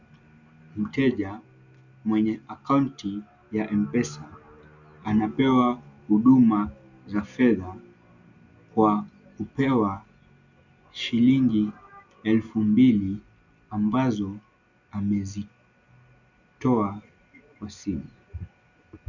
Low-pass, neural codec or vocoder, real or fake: 7.2 kHz; none; real